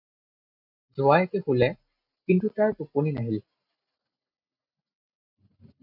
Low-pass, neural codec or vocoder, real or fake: 5.4 kHz; none; real